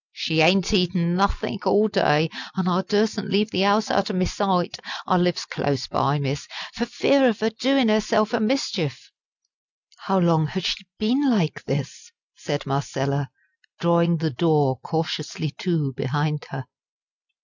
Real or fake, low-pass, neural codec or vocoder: real; 7.2 kHz; none